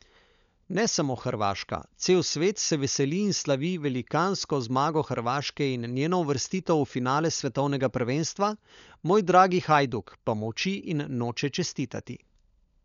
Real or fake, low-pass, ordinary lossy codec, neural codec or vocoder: fake; 7.2 kHz; none; codec, 16 kHz, 16 kbps, FunCodec, trained on LibriTTS, 50 frames a second